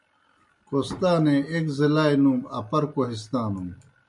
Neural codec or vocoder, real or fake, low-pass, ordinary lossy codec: none; real; 10.8 kHz; MP3, 64 kbps